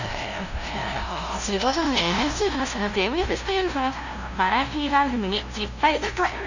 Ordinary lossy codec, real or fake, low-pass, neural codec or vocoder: none; fake; 7.2 kHz; codec, 16 kHz, 0.5 kbps, FunCodec, trained on LibriTTS, 25 frames a second